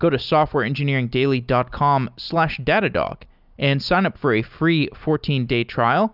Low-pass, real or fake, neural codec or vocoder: 5.4 kHz; real; none